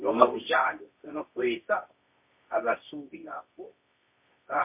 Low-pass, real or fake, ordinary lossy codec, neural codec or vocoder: 3.6 kHz; fake; MP3, 24 kbps; codec, 24 kHz, 0.9 kbps, WavTokenizer, medium music audio release